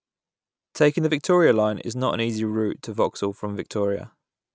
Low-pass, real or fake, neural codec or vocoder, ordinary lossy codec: none; real; none; none